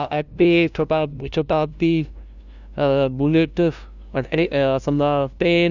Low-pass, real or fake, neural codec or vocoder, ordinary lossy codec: 7.2 kHz; fake; codec, 16 kHz, 0.5 kbps, FunCodec, trained on LibriTTS, 25 frames a second; none